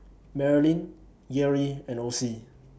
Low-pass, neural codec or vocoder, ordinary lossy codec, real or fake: none; none; none; real